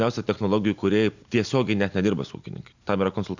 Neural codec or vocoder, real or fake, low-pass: none; real; 7.2 kHz